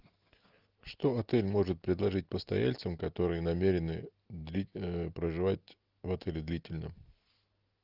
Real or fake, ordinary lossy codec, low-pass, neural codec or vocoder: real; Opus, 24 kbps; 5.4 kHz; none